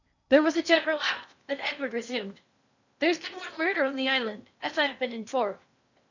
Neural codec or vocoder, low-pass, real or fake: codec, 16 kHz in and 24 kHz out, 0.8 kbps, FocalCodec, streaming, 65536 codes; 7.2 kHz; fake